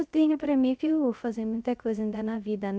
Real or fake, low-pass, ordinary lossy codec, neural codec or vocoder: fake; none; none; codec, 16 kHz, 0.3 kbps, FocalCodec